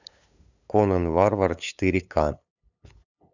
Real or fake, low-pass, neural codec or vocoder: fake; 7.2 kHz; codec, 16 kHz, 8 kbps, FunCodec, trained on LibriTTS, 25 frames a second